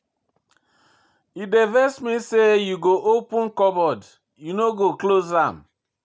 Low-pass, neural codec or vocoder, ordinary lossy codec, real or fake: none; none; none; real